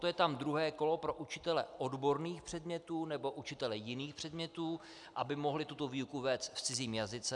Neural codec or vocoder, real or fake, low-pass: none; real; 10.8 kHz